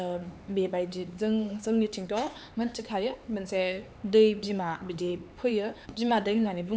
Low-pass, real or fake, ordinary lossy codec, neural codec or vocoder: none; fake; none; codec, 16 kHz, 4 kbps, X-Codec, HuBERT features, trained on LibriSpeech